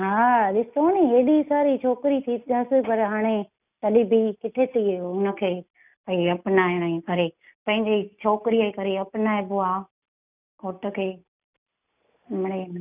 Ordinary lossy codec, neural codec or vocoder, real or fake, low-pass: none; none; real; 3.6 kHz